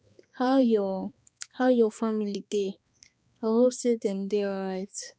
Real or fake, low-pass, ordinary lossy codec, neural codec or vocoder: fake; none; none; codec, 16 kHz, 2 kbps, X-Codec, HuBERT features, trained on balanced general audio